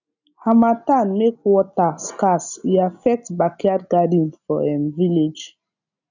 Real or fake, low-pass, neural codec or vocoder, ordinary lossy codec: real; 7.2 kHz; none; none